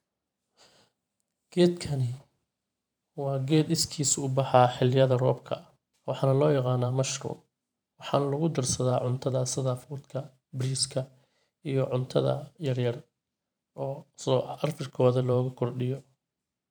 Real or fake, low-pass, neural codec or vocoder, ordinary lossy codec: fake; none; vocoder, 44.1 kHz, 128 mel bands every 512 samples, BigVGAN v2; none